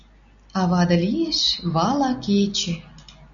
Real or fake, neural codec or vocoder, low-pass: real; none; 7.2 kHz